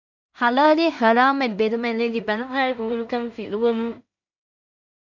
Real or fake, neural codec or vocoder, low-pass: fake; codec, 16 kHz in and 24 kHz out, 0.4 kbps, LongCat-Audio-Codec, two codebook decoder; 7.2 kHz